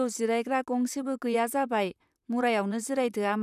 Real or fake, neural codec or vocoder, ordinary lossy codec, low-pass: fake; vocoder, 44.1 kHz, 128 mel bands, Pupu-Vocoder; none; 14.4 kHz